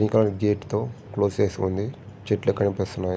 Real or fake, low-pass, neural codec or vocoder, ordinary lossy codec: real; none; none; none